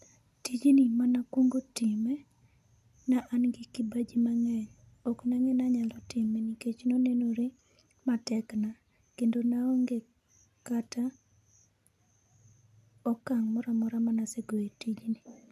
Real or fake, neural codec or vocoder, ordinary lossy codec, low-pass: real; none; none; 14.4 kHz